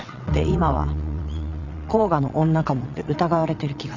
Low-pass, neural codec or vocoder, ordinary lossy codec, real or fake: 7.2 kHz; vocoder, 22.05 kHz, 80 mel bands, WaveNeXt; none; fake